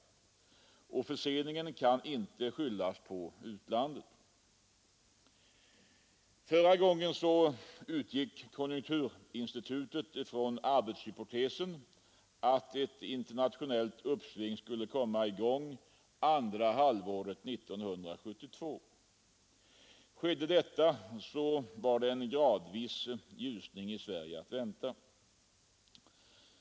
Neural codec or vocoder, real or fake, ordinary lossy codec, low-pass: none; real; none; none